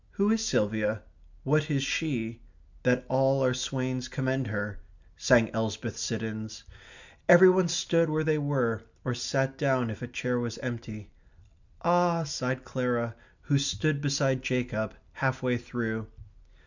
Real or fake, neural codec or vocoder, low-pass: real; none; 7.2 kHz